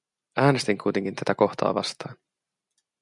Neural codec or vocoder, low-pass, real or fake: none; 10.8 kHz; real